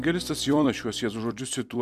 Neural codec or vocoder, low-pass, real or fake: vocoder, 44.1 kHz, 128 mel bands every 256 samples, BigVGAN v2; 14.4 kHz; fake